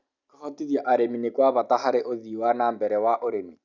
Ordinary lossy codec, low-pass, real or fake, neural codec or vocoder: none; 7.2 kHz; real; none